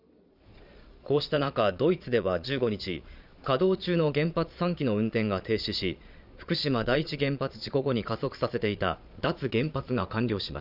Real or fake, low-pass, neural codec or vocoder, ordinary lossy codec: fake; 5.4 kHz; vocoder, 22.05 kHz, 80 mel bands, Vocos; none